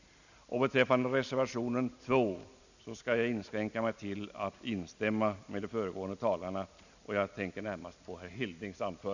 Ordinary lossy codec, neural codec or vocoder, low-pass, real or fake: none; none; 7.2 kHz; real